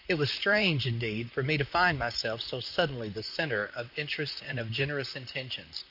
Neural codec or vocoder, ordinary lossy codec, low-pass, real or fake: vocoder, 44.1 kHz, 128 mel bands, Pupu-Vocoder; AAC, 48 kbps; 5.4 kHz; fake